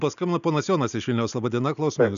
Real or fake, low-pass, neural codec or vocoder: real; 7.2 kHz; none